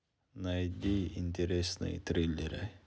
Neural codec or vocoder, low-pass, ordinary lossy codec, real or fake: none; none; none; real